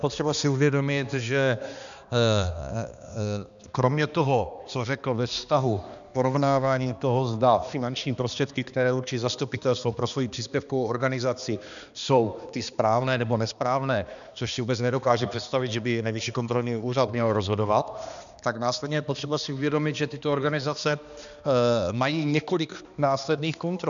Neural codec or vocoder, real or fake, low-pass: codec, 16 kHz, 2 kbps, X-Codec, HuBERT features, trained on balanced general audio; fake; 7.2 kHz